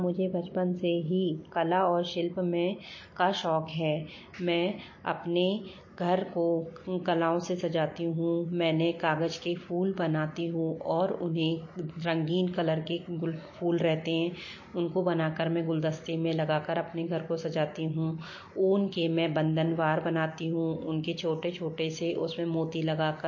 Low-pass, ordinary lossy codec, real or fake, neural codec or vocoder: 7.2 kHz; MP3, 32 kbps; real; none